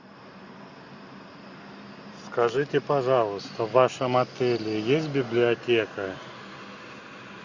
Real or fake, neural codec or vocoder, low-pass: real; none; 7.2 kHz